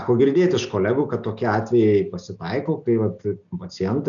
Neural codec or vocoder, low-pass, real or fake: none; 7.2 kHz; real